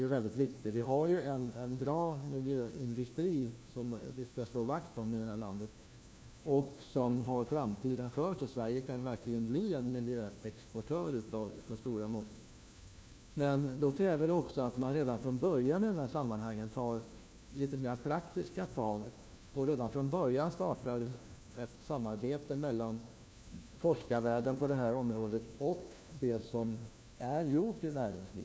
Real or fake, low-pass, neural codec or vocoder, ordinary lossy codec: fake; none; codec, 16 kHz, 1 kbps, FunCodec, trained on LibriTTS, 50 frames a second; none